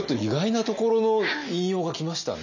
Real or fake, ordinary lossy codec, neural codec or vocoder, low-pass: real; none; none; 7.2 kHz